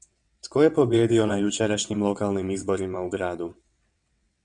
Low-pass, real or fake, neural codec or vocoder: 9.9 kHz; fake; vocoder, 22.05 kHz, 80 mel bands, WaveNeXt